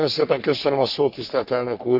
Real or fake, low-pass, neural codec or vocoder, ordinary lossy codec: fake; 5.4 kHz; codec, 44.1 kHz, 3.4 kbps, Pupu-Codec; none